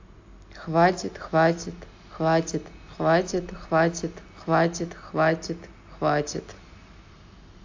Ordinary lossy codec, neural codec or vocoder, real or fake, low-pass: AAC, 48 kbps; none; real; 7.2 kHz